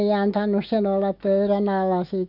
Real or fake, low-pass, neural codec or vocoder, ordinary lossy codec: fake; 5.4 kHz; autoencoder, 48 kHz, 128 numbers a frame, DAC-VAE, trained on Japanese speech; none